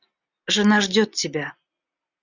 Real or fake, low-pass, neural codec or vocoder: real; 7.2 kHz; none